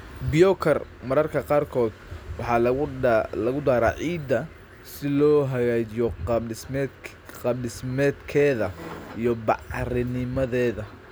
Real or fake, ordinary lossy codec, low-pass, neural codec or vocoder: real; none; none; none